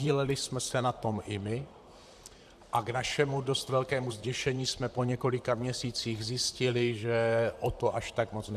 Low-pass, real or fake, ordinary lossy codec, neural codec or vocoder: 14.4 kHz; fake; AAC, 96 kbps; vocoder, 44.1 kHz, 128 mel bands, Pupu-Vocoder